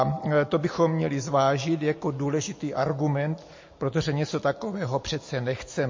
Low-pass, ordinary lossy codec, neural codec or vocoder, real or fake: 7.2 kHz; MP3, 32 kbps; none; real